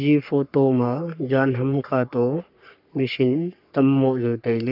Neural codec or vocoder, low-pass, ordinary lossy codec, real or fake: codec, 44.1 kHz, 3.4 kbps, Pupu-Codec; 5.4 kHz; none; fake